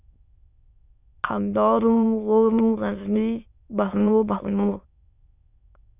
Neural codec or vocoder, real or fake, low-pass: autoencoder, 22.05 kHz, a latent of 192 numbers a frame, VITS, trained on many speakers; fake; 3.6 kHz